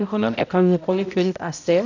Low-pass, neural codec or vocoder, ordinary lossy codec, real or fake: 7.2 kHz; codec, 16 kHz, 0.5 kbps, X-Codec, HuBERT features, trained on balanced general audio; none; fake